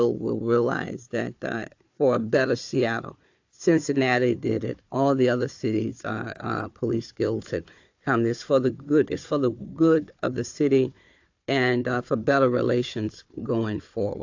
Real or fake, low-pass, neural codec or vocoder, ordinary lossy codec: fake; 7.2 kHz; codec, 16 kHz, 4 kbps, FunCodec, trained on Chinese and English, 50 frames a second; AAC, 48 kbps